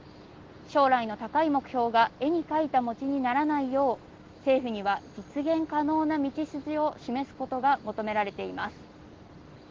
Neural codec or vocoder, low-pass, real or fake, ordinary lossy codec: none; 7.2 kHz; real; Opus, 16 kbps